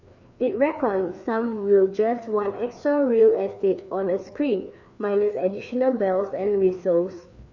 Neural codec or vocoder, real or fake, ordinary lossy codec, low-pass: codec, 16 kHz, 2 kbps, FreqCodec, larger model; fake; none; 7.2 kHz